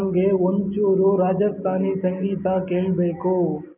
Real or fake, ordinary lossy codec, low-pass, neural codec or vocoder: real; none; 3.6 kHz; none